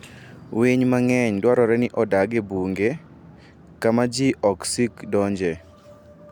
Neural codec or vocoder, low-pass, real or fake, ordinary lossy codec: none; 19.8 kHz; real; none